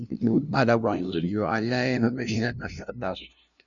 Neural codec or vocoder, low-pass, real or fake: codec, 16 kHz, 0.5 kbps, FunCodec, trained on LibriTTS, 25 frames a second; 7.2 kHz; fake